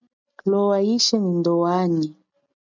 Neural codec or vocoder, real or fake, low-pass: none; real; 7.2 kHz